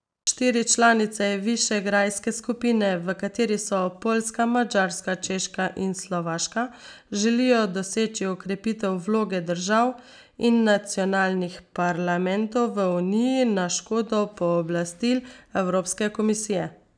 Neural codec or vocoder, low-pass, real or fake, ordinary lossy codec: none; 9.9 kHz; real; none